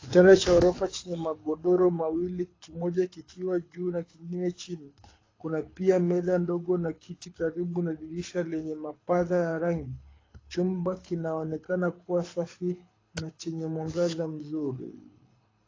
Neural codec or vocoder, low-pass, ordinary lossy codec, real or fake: codec, 24 kHz, 6 kbps, HILCodec; 7.2 kHz; AAC, 32 kbps; fake